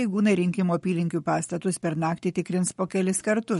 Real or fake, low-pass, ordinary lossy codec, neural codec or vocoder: fake; 19.8 kHz; MP3, 48 kbps; codec, 44.1 kHz, 7.8 kbps, Pupu-Codec